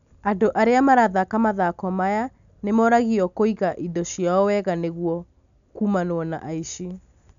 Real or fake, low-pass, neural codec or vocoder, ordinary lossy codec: real; 7.2 kHz; none; none